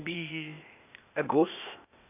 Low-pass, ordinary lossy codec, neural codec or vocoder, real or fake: 3.6 kHz; none; codec, 16 kHz, 0.8 kbps, ZipCodec; fake